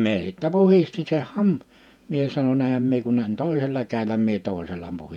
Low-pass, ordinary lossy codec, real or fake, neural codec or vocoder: 19.8 kHz; none; fake; vocoder, 48 kHz, 128 mel bands, Vocos